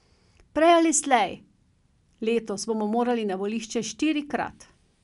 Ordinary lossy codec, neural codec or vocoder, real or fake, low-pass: none; none; real; 10.8 kHz